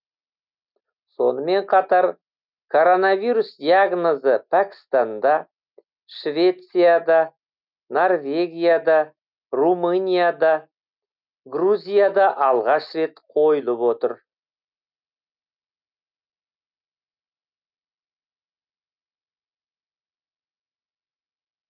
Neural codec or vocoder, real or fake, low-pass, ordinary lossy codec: none; real; 5.4 kHz; none